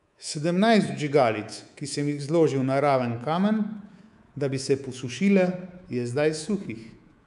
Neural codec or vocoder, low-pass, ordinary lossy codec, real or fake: codec, 24 kHz, 3.1 kbps, DualCodec; 10.8 kHz; none; fake